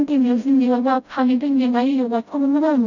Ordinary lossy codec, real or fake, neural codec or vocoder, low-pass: none; fake; codec, 16 kHz, 0.5 kbps, FreqCodec, smaller model; 7.2 kHz